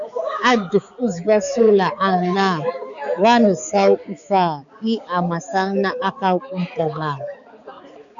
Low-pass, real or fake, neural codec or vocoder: 7.2 kHz; fake; codec, 16 kHz, 4 kbps, X-Codec, HuBERT features, trained on balanced general audio